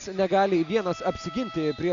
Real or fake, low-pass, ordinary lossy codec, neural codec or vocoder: real; 7.2 kHz; MP3, 64 kbps; none